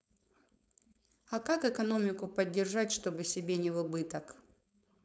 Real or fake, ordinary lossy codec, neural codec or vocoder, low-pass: fake; none; codec, 16 kHz, 4.8 kbps, FACodec; none